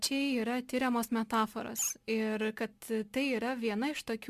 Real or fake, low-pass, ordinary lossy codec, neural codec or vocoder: real; 14.4 kHz; Opus, 64 kbps; none